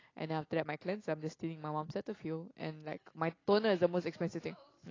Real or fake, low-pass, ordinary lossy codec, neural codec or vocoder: real; 7.2 kHz; AAC, 32 kbps; none